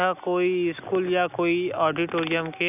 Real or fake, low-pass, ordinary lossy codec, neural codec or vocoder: real; 3.6 kHz; none; none